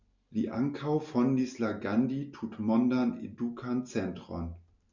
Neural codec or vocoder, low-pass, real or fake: none; 7.2 kHz; real